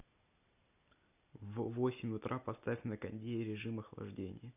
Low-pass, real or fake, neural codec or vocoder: 3.6 kHz; real; none